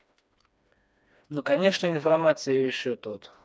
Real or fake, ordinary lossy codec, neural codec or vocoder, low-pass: fake; none; codec, 16 kHz, 2 kbps, FreqCodec, smaller model; none